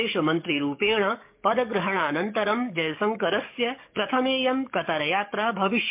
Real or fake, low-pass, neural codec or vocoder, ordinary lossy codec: fake; 3.6 kHz; codec, 44.1 kHz, 7.8 kbps, DAC; MP3, 32 kbps